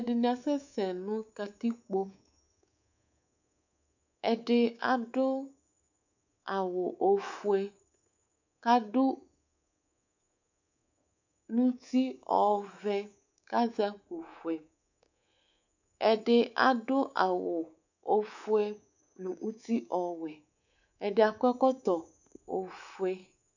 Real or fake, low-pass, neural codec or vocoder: fake; 7.2 kHz; codec, 24 kHz, 3.1 kbps, DualCodec